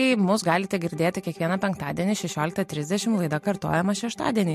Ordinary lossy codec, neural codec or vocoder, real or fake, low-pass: MP3, 64 kbps; none; real; 14.4 kHz